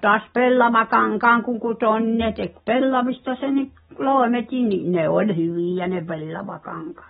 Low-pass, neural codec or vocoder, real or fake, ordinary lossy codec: 19.8 kHz; none; real; AAC, 16 kbps